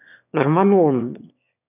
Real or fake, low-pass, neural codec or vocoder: fake; 3.6 kHz; autoencoder, 22.05 kHz, a latent of 192 numbers a frame, VITS, trained on one speaker